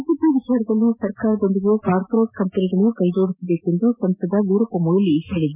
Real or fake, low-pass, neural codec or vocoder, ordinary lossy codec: real; 3.6 kHz; none; none